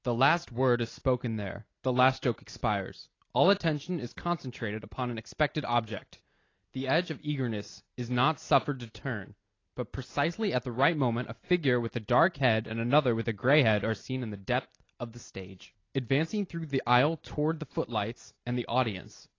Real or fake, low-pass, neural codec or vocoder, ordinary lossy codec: real; 7.2 kHz; none; AAC, 32 kbps